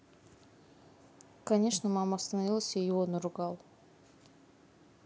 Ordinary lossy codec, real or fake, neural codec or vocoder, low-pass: none; real; none; none